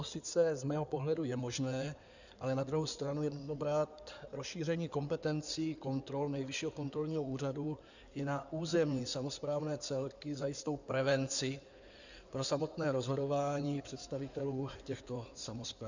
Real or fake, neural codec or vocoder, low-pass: fake; codec, 16 kHz in and 24 kHz out, 2.2 kbps, FireRedTTS-2 codec; 7.2 kHz